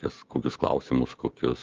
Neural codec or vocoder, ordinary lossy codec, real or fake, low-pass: codec, 16 kHz, 6 kbps, DAC; Opus, 32 kbps; fake; 7.2 kHz